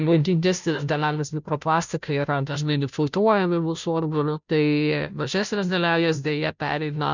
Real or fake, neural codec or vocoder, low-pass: fake; codec, 16 kHz, 0.5 kbps, FunCodec, trained on Chinese and English, 25 frames a second; 7.2 kHz